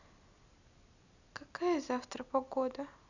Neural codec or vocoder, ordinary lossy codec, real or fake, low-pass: none; none; real; 7.2 kHz